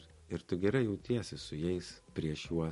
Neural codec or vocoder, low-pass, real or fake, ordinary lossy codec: none; 14.4 kHz; real; MP3, 48 kbps